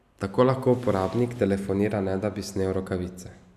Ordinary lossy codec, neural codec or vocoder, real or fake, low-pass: none; none; real; 14.4 kHz